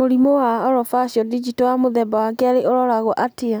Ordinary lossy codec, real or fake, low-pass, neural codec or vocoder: none; real; none; none